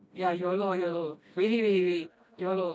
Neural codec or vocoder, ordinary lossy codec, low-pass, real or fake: codec, 16 kHz, 1 kbps, FreqCodec, smaller model; none; none; fake